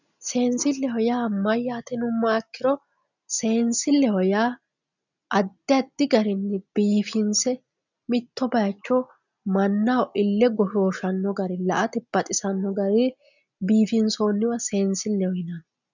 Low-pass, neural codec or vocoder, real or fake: 7.2 kHz; none; real